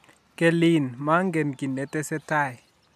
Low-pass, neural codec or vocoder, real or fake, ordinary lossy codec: 14.4 kHz; none; real; AAC, 96 kbps